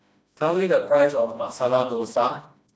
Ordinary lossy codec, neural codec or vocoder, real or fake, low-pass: none; codec, 16 kHz, 1 kbps, FreqCodec, smaller model; fake; none